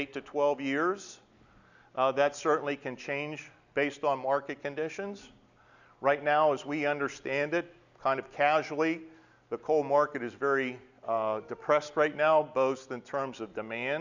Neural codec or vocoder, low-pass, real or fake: none; 7.2 kHz; real